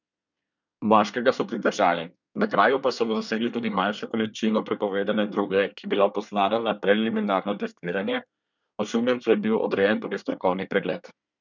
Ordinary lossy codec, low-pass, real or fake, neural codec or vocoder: none; 7.2 kHz; fake; codec, 24 kHz, 1 kbps, SNAC